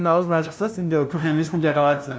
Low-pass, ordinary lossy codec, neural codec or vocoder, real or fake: none; none; codec, 16 kHz, 0.5 kbps, FunCodec, trained on LibriTTS, 25 frames a second; fake